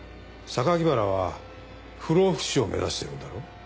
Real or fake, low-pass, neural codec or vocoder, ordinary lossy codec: real; none; none; none